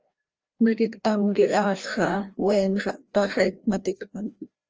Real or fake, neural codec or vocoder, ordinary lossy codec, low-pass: fake; codec, 16 kHz, 1 kbps, FreqCodec, larger model; Opus, 24 kbps; 7.2 kHz